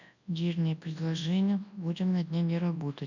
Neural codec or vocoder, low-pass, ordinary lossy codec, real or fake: codec, 24 kHz, 0.9 kbps, WavTokenizer, large speech release; 7.2 kHz; none; fake